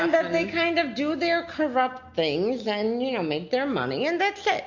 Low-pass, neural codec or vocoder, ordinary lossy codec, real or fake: 7.2 kHz; none; MP3, 64 kbps; real